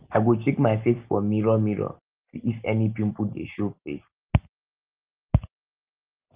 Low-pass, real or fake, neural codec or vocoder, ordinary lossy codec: 3.6 kHz; real; none; Opus, 24 kbps